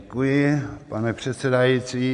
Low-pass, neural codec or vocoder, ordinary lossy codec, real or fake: 10.8 kHz; none; MP3, 64 kbps; real